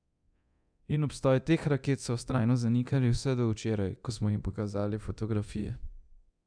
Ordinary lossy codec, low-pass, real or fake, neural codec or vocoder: MP3, 96 kbps; 9.9 kHz; fake; codec, 24 kHz, 0.9 kbps, DualCodec